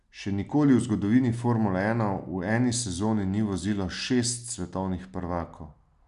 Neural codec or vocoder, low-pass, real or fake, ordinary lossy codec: none; 10.8 kHz; real; none